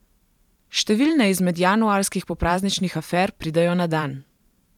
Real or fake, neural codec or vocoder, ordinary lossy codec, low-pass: fake; vocoder, 44.1 kHz, 128 mel bands every 512 samples, BigVGAN v2; none; 19.8 kHz